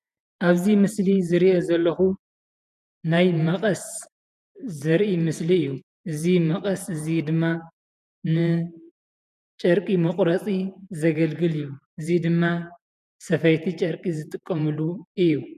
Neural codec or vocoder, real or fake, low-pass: vocoder, 48 kHz, 128 mel bands, Vocos; fake; 14.4 kHz